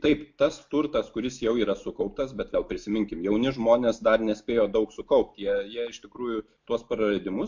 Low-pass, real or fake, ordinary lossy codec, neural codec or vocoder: 7.2 kHz; real; MP3, 48 kbps; none